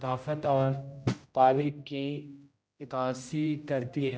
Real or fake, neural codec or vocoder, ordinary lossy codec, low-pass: fake; codec, 16 kHz, 0.5 kbps, X-Codec, HuBERT features, trained on general audio; none; none